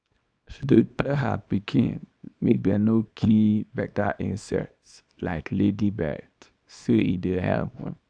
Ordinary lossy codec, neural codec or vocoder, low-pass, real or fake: none; codec, 24 kHz, 0.9 kbps, WavTokenizer, small release; 9.9 kHz; fake